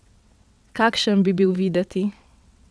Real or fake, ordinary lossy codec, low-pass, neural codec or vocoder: fake; none; none; vocoder, 22.05 kHz, 80 mel bands, WaveNeXt